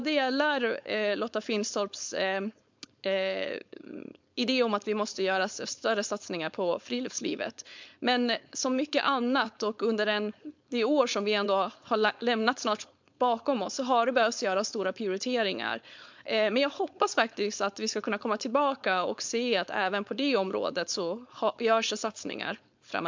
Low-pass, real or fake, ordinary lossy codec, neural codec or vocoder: 7.2 kHz; fake; MP3, 64 kbps; codec, 16 kHz, 4.8 kbps, FACodec